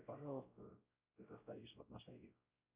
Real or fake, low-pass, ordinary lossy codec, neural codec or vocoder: fake; 3.6 kHz; Opus, 64 kbps; codec, 16 kHz, 0.5 kbps, X-Codec, WavLM features, trained on Multilingual LibriSpeech